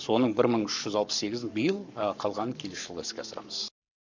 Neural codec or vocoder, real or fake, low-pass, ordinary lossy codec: codec, 44.1 kHz, 7.8 kbps, Pupu-Codec; fake; 7.2 kHz; none